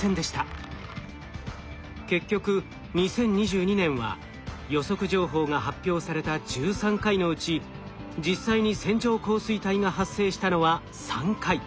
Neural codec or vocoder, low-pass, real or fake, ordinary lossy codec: none; none; real; none